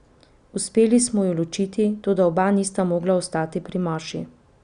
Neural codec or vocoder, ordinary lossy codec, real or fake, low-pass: none; none; real; 9.9 kHz